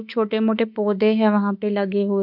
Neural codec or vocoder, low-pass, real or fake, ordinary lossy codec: autoencoder, 48 kHz, 32 numbers a frame, DAC-VAE, trained on Japanese speech; 5.4 kHz; fake; none